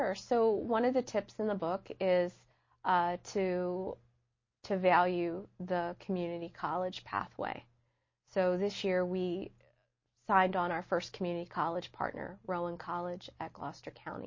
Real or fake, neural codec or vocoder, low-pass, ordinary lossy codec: real; none; 7.2 kHz; MP3, 32 kbps